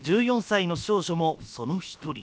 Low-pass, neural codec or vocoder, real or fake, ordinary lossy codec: none; codec, 16 kHz, about 1 kbps, DyCAST, with the encoder's durations; fake; none